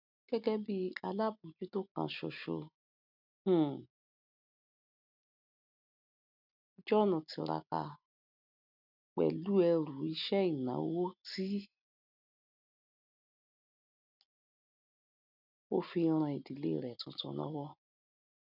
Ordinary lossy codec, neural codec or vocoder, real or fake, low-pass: none; none; real; 5.4 kHz